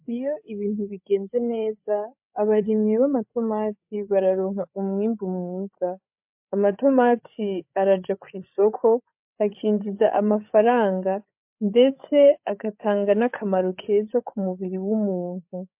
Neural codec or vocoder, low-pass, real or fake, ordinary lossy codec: codec, 16 kHz, 8 kbps, FreqCodec, larger model; 3.6 kHz; fake; MP3, 32 kbps